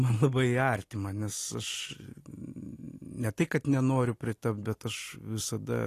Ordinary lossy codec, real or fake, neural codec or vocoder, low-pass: AAC, 48 kbps; real; none; 14.4 kHz